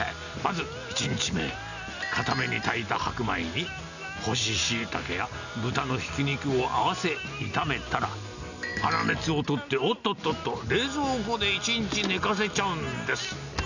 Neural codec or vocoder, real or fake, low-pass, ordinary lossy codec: none; real; 7.2 kHz; none